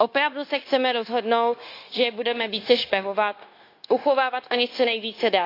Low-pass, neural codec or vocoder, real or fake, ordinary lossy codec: 5.4 kHz; codec, 16 kHz, 0.9 kbps, LongCat-Audio-Codec; fake; AAC, 32 kbps